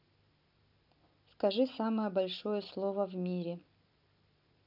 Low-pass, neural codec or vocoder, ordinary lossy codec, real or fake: 5.4 kHz; none; none; real